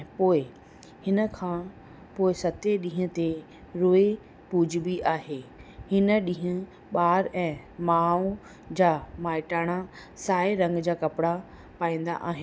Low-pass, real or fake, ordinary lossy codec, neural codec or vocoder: none; real; none; none